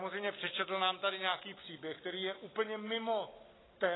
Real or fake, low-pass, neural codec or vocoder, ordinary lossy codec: real; 7.2 kHz; none; AAC, 16 kbps